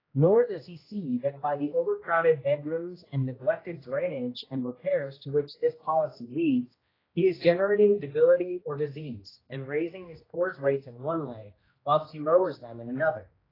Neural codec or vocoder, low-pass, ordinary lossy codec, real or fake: codec, 16 kHz, 1 kbps, X-Codec, HuBERT features, trained on general audio; 5.4 kHz; AAC, 24 kbps; fake